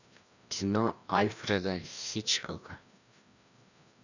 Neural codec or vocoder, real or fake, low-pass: codec, 16 kHz, 1 kbps, FreqCodec, larger model; fake; 7.2 kHz